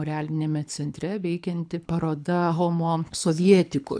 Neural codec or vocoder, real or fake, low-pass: codec, 24 kHz, 6 kbps, HILCodec; fake; 9.9 kHz